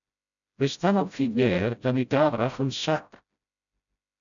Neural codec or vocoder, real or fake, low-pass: codec, 16 kHz, 0.5 kbps, FreqCodec, smaller model; fake; 7.2 kHz